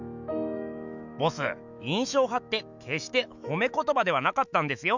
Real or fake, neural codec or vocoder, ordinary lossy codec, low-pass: fake; autoencoder, 48 kHz, 128 numbers a frame, DAC-VAE, trained on Japanese speech; none; 7.2 kHz